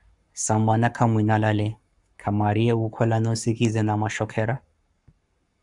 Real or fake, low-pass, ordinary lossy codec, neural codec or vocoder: fake; 10.8 kHz; Opus, 64 kbps; codec, 44.1 kHz, 7.8 kbps, Pupu-Codec